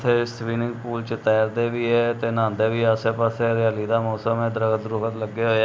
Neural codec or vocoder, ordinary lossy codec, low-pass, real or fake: none; none; none; real